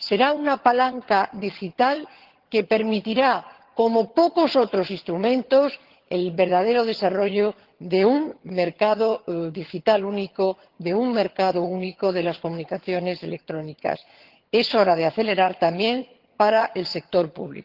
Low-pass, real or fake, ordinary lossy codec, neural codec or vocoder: 5.4 kHz; fake; Opus, 16 kbps; vocoder, 22.05 kHz, 80 mel bands, HiFi-GAN